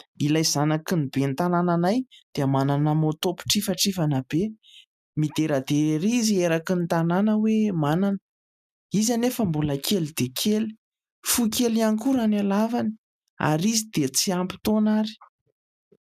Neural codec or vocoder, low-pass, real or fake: none; 14.4 kHz; real